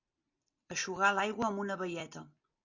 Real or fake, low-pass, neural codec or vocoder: real; 7.2 kHz; none